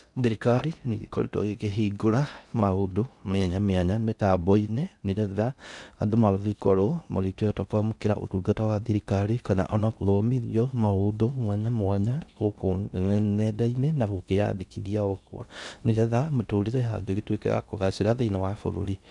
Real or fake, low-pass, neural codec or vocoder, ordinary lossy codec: fake; 10.8 kHz; codec, 16 kHz in and 24 kHz out, 0.6 kbps, FocalCodec, streaming, 4096 codes; none